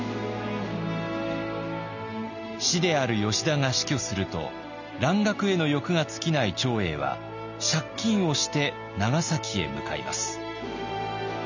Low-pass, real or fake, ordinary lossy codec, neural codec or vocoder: 7.2 kHz; real; none; none